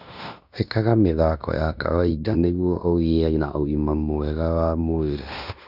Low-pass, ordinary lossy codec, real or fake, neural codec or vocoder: 5.4 kHz; none; fake; codec, 16 kHz in and 24 kHz out, 0.9 kbps, LongCat-Audio-Codec, fine tuned four codebook decoder